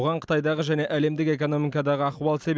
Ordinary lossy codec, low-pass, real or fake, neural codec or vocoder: none; none; real; none